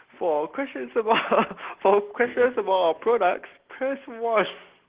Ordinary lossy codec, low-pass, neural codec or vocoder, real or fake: Opus, 16 kbps; 3.6 kHz; none; real